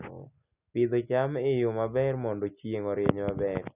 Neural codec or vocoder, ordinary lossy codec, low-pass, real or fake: none; none; 3.6 kHz; real